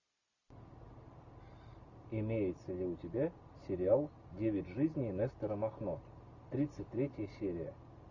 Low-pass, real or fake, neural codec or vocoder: 7.2 kHz; real; none